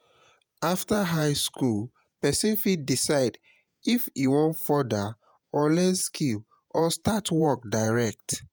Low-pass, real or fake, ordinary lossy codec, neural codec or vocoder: none; fake; none; vocoder, 48 kHz, 128 mel bands, Vocos